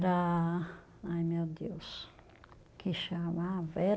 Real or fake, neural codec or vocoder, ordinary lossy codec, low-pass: real; none; none; none